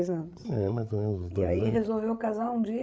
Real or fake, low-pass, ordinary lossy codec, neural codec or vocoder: fake; none; none; codec, 16 kHz, 8 kbps, FreqCodec, larger model